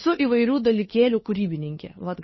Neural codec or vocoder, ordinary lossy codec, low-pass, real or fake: codec, 16 kHz, 2 kbps, FunCodec, trained on Chinese and English, 25 frames a second; MP3, 24 kbps; 7.2 kHz; fake